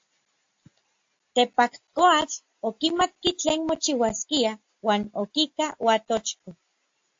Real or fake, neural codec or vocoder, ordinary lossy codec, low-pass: real; none; AAC, 48 kbps; 7.2 kHz